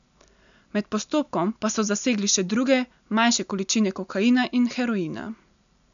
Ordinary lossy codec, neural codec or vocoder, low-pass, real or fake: none; none; 7.2 kHz; real